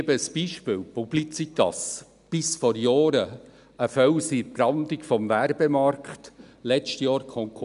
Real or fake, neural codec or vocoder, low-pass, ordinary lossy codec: real; none; 10.8 kHz; none